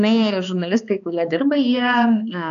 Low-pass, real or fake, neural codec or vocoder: 7.2 kHz; fake; codec, 16 kHz, 2 kbps, X-Codec, HuBERT features, trained on balanced general audio